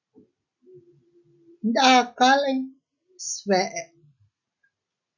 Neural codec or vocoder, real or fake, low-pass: none; real; 7.2 kHz